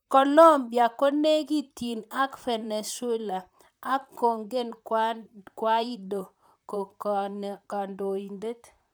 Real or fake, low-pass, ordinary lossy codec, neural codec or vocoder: fake; none; none; vocoder, 44.1 kHz, 128 mel bands, Pupu-Vocoder